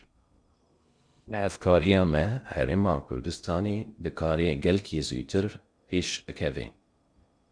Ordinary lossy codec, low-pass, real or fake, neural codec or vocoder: Opus, 64 kbps; 9.9 kHz; fake; codec, 16 kHz in and 24 kHz out, 0.6 kbps, FocalCodec, streaming, 2048 codes